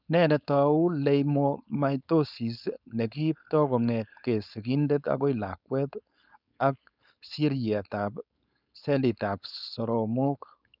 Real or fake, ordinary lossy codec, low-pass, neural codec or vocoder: fake; none; 5.4 kHz; codec, 16 kHz, 4.8 kbps, FACodec